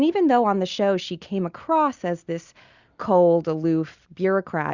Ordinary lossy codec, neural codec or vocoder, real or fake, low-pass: Opus, 64 kbps; none; real; 7.2 kHz